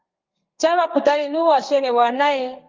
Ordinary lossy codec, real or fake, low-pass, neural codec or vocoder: Opus, 24 kbps; fake; 7.2 kHz; codec, 32 kHz, 1.9 kbps, SNAC